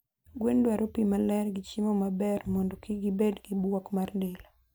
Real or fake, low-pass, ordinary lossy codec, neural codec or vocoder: real; none; none; none